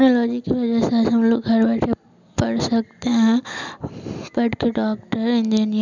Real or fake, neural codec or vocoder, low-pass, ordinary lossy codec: real; none; 7.2 kHz; none